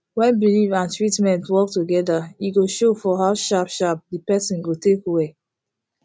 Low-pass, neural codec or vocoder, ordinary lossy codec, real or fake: none; none; none; real